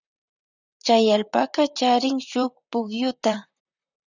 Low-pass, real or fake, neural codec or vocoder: 7.2 kHz; fake; vocoder, 44.1 kHz, 128 mel bands, Pupu-Vocoder